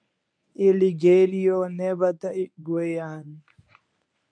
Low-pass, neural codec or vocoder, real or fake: 9.9 kHz; codec, 24 kHz, 0.9 kbps, WavTokenizer, medium speech release version 1; fake